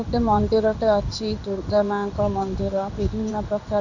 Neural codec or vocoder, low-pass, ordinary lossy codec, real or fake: codec, 16 kHz in and 24 kHz out, 1 kbps, XY-Tokenizer; 7.2 kHz; none; fake